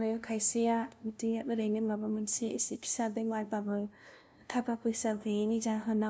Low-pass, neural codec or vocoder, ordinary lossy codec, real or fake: none; codec, 16 kHz, 0.5 kbps, FunCodec, trained on LibriTTS, 25 frames a second; none; fake